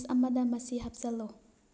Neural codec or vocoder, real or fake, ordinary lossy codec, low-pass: none; real; none; none